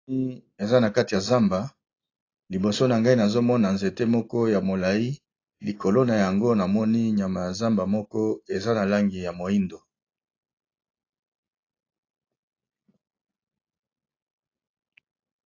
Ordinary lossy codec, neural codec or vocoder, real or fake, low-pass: AAC, 32 kbps; none; real; 7.2 kHz